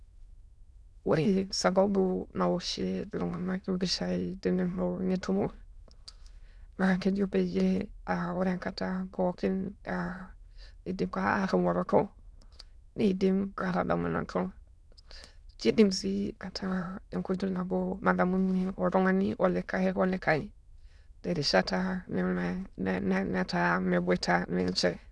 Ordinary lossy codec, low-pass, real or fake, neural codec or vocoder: none; none; fake; autoencoder, 22.05 kHz, a latent of 192 numbers a frame, VITS, trained on many speakers